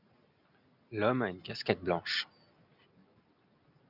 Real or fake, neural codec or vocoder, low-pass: real; none; 5.4 kHz